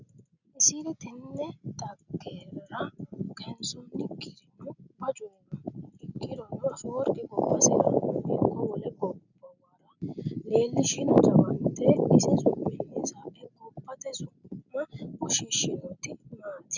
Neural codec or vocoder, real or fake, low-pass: none; real; 7.2 kHz